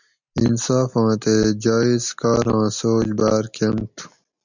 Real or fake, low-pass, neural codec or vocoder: real; 7.2 kHz; none